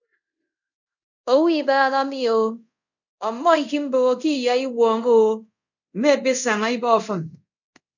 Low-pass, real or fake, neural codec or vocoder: 7.2 kHz; fake; codec, 24 kHz, 0.5 kbps, DualCodec